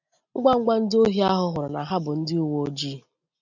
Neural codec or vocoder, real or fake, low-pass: none; real; 7.2 kHz